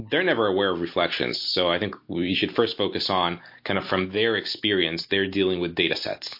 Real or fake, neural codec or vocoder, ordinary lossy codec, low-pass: real; none; MP3, 32 kbps; 5.4 kHz